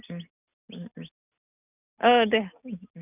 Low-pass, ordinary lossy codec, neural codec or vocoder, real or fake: 3.6 kHz; none; none; real